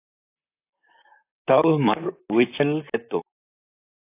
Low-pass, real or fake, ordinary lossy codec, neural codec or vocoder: 3.6 kHz; fake; AAC, 24 kbps; vocoder, 44.1 kHz, 128 mel bands, Pupu-Vocoder